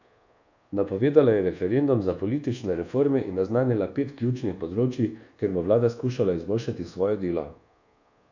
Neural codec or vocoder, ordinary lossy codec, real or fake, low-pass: codec, 24 kHz, 1.2 kbps, DualCodec; none; fake; 7.2 kHz